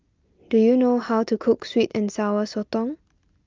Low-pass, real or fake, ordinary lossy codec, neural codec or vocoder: 7.2 kHz; real; Opus, 24 kbps; none